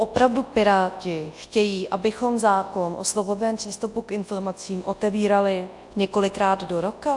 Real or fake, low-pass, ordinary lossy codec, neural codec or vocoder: fake; 10.8 kHz; AAC, 64 kbps; codec, 24 kHz, 0.9 kbps, WavTokenizer, large speech release